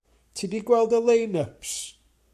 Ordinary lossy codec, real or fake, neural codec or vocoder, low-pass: AAC, 96 kbps; fake; codec, 44.1 kHz, 7.8 kbps, Pupu-Codec; 14.4 kHz